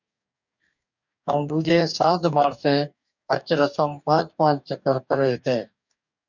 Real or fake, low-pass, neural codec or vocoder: fake; 7.2 kHz; codec, 44.1 kHz, 2.6 kbps, DAC